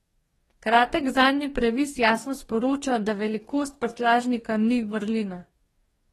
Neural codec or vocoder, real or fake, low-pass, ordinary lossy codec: codec, 44.1 kHz, 2.6 kbps, DAC; fake; 19.8 kHz; AAC, 32 kbps